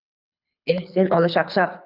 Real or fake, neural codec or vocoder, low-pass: fake; codec, 24 kHz, 6 kbps, HILCodec; 5.4 kHz